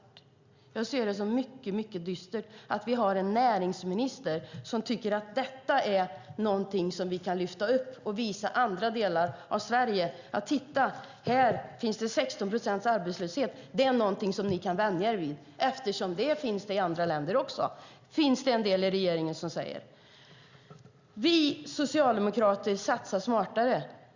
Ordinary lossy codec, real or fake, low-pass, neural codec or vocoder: Opus, 64 kbps; real; 7.2 kHz; none